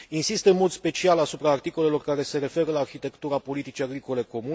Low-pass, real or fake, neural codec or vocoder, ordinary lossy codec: none; real; none; none